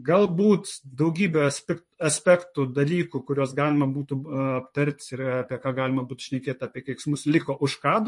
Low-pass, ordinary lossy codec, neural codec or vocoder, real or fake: 10.8 kHz; MP3, 48 kbps; vocoder, 44.1 kHz, 128 mel bands, Pupu-Vocoder; fake